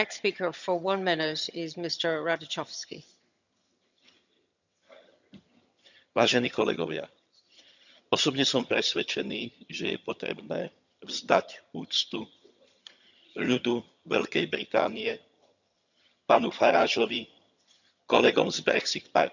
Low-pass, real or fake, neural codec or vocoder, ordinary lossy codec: 7.2 kHz; fake; vocoder, 22.05 kHz, 80 mel bands, HiFi-GAN; none